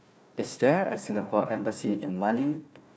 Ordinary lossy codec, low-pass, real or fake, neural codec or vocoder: none; none; fake; codec, 16 kHz, 1 kbps, FunCodec, trained on Chinese and English, 50 frames a second